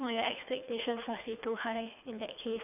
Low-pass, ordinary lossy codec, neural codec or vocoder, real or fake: 3.6 kHz; none; codec, 24 kHz, 3 kbps, HILCodec; fake